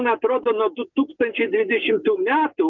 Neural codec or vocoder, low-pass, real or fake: none; 7.2 kHz; real